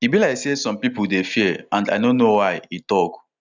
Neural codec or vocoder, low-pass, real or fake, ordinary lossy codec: none; 7.2 kHz; real; none